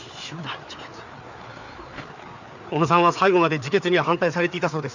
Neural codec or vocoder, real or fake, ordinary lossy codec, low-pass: codec, 16 kHz, 4 kbps, FreqCodec, larger model; fake; none; 7.2 kHz